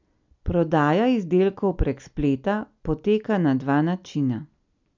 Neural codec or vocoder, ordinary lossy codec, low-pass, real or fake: none; MP3, 64 kbps; 7.2 kHz; real